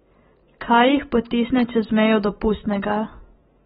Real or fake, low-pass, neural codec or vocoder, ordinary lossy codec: real; 19.8 kHz; none; AAC, 16 kbps